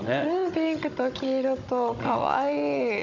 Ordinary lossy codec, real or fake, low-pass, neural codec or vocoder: none; fake; 7.2 kHz; codec, 16 kHz, 16 kbps, FunCodec, trained on Chinese and English, 50 frames a second